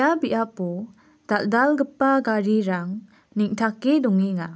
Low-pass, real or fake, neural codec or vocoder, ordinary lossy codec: none; real; none; none